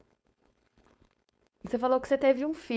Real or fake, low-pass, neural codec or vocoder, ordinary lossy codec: fake; none; codec, 16 kHz, 4.8 kbps, FACodec; none